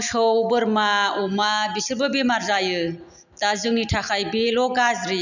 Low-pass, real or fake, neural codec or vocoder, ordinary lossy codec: 7.2 kHz; real; none; none